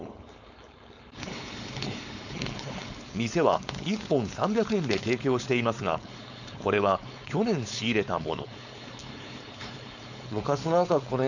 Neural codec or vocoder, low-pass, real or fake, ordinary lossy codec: codec, 16 kHz, 4.8 kbps, FACodec; 7.2 kHz; fake; none